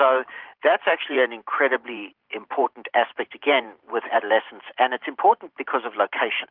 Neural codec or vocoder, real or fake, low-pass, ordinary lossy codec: vocoder, 44.1 kHz, 128 mel bands every 512 samples, BigVGAN v2; fake; 5.4 kHz; Opus, 32 kbps